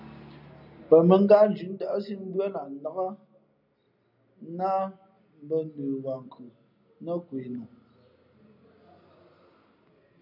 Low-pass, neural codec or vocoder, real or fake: 5.4 kHz; none; real